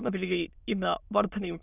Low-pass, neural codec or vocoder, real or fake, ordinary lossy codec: 3.6 kHz; autoencoder, 22.05 kHz, a latent of 192 numbers a frame, VITS, trained on many speakers; fake; none